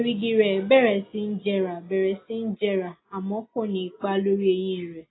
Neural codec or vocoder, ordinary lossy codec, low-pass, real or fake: none; AAC, 16 kbps; 7.2 kHz; real